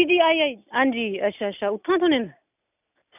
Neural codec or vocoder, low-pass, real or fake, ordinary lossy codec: none; 3.6 kHz; real; none